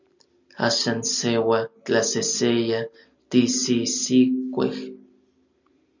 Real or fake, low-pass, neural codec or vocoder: real; 7.2 kHz; none